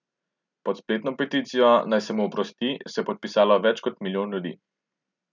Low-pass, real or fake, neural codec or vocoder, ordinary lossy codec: 7.2 kHz; real; none; none